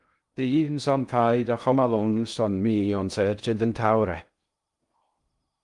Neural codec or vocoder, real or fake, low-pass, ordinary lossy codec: codec, 16 kHz in and 24 kHz out, 0.6 kbps, FocalCodec, streaming, 2048 codes; fake; 10.8 kHz; Opus, 32 kbps